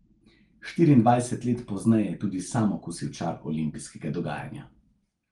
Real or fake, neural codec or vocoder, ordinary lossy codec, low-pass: real; none; Opus, 24 kbps; 10.8 kHz